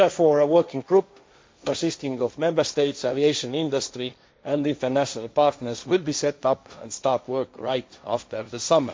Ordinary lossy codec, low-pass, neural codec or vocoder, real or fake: none; none; codec, 16 kHz, 1.1 kbps, Voila-Tokenizer; fake